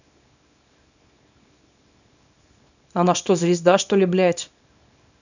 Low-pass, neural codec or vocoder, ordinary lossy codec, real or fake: 7.2 kHz; codec, 24 kHz, 0.9 kbps, WavTokenizer, small release; none; fake